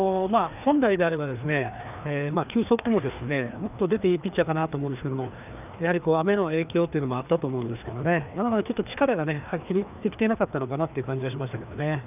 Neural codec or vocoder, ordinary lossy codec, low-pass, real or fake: codec, 16 kHz, 2 kbps, FreqCodec, larger model; none; 3.6 kHz; fake